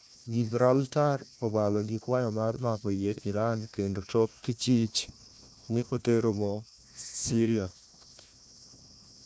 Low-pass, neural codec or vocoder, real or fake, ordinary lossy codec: none; codec, 16 kHz, 1 kbps, FunCodec, trained on Chinese and English, 50 frames a second; fake; none